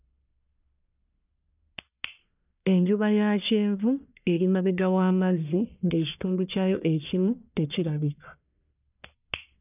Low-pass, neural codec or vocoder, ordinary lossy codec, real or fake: 3.6 kHz; codec, 44.1 kHz, 1.7 kbps, Pupu-Codec; none; fake